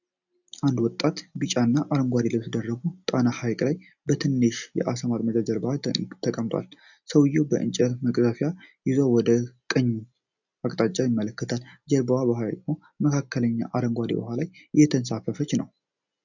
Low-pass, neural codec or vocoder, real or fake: 7.2 kHz; none; real